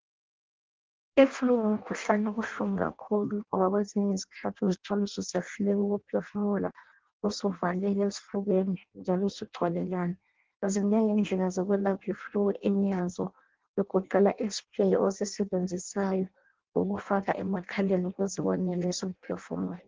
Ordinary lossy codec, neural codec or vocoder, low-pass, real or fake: Opus, 16 kbps; codec, 16 kHz in and 24 kHz out, 0.6 kbps, FireRedTTS-2 codec; 7.2 kHz; fake